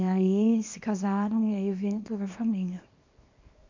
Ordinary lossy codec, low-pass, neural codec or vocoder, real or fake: MP3, 48 kbps; 7.2 kHz; codec, 24 kHz, 0.9 kbps, WavTokenizer, small release; fake